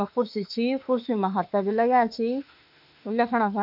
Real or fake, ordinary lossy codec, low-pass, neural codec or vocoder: fake; none; 5.4 kHz; autoencoder, 48 kHz, 32 numbers a frame, DAC-VAE, trained on Japanese speech